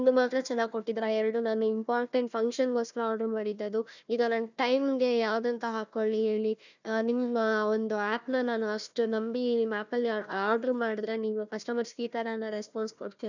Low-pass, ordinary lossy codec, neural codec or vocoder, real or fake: 7.2 kHz; none; codec, 16 kHz, 1 kbps, FunCodec, trained on Chinese and English, 50 frames a second; fake